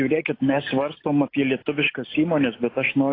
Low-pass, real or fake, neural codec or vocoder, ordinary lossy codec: 5.4 kHz; real; none; AAC, 24 kbps